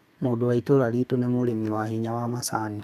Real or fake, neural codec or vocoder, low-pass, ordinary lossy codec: fake; codec, 32 kHz, 1.9 kbps, SNAC; 14.4 kHz; none